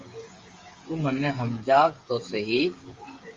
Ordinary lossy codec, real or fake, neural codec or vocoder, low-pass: Opus, 32 kbps; fake; codec, 16 kHz, 8 kbps, FreqCodec, smaller model; 7.2 kHz